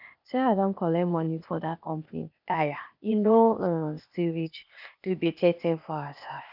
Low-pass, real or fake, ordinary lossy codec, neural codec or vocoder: 5.4 kHz; fake; none; codec, 16 kHz, 0.8 kbps, ZipCodec